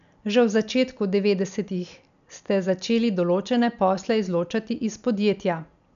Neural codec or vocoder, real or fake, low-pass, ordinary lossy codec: none; real; 7.2 kHz; none